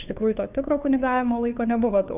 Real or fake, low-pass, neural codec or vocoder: fake; 3.6 kHz; codec, 16 kHz, 2 kbps, FunCodec, trained on Chinese and English, 25 frames a second